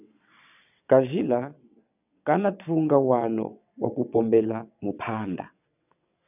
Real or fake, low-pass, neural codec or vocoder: fake; 3.6 kHz; vocoder, 22.05 kHz, 80 mel bands, WaveNeXt